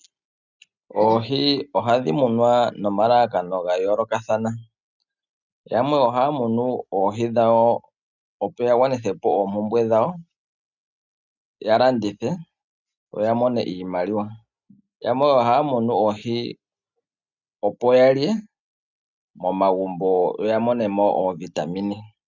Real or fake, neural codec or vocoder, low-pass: real; none; 7.2 kHz